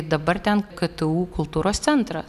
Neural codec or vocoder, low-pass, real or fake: none; 14.4 kHz; real